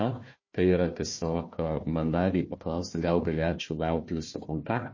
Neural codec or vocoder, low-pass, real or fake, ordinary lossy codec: codec, 16 kHz, 1 kbps, FunCodec, trained on Chinese and English, 50 frames a second; 7.2 kHz; fake; MP3, 32 kbps